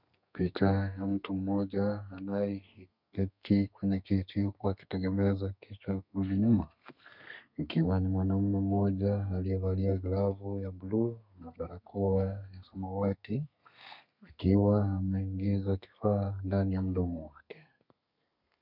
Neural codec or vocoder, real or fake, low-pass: codec, 32 kHz, 1.9 kbps, SNAC; fake; 5.4 kHz